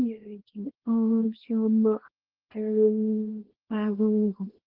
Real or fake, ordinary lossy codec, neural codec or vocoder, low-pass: fake; Opus, 16 kbps; codec, 24 kHz, 0.9 kbps, WavTokenizer, small release; 5.4 kHz